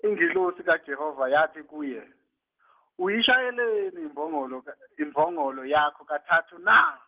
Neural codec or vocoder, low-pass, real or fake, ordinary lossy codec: none; 3.6 kHz; real; Opus, 16 kbps